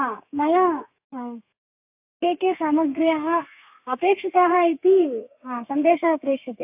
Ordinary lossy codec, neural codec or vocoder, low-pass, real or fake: AAC, 32 kbps; codec, 32 kHz, 1.9 kbps, SNAC; 3.6 kHz; fake